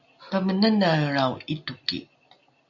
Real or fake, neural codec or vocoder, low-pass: real; none; 7.2 kHz